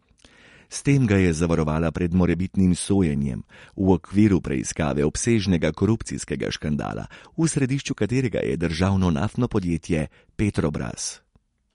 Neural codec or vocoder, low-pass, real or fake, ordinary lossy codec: none; 10.8 kHz; real; MP3, 48 kbps